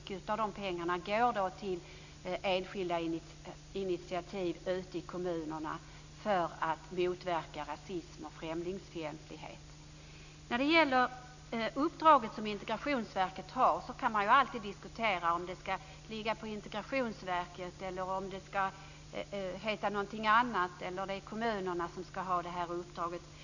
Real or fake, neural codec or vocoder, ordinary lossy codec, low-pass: real; none; none; 7.2 kHz